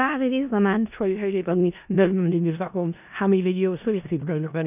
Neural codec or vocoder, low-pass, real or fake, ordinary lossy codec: codec, 16 kHz in and 24 kHz out, 0.4 kbps, LongCat-Audio-Codec, four codebook decoder; 3.6 kHz; fake; none